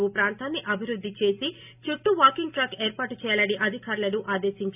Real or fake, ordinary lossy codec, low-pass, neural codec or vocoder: real; none; 3.6 kHz; none